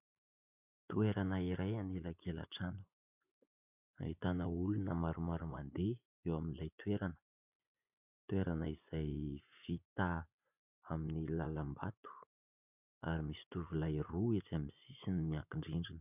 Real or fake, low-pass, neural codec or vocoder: fake; 3.6 kHz; vocoder, 24 kHz, 100 mel bands, Vocos